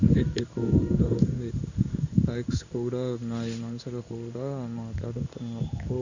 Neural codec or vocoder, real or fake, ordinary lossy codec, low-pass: codec, 16 kHz in and 24 kHz out, 1 kbps, XY-Tokenizer; fake; none; 7.2 kHz